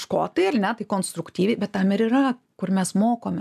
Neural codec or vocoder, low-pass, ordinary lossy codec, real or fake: none; 14.4 kHz; AAC, 96 kbps; real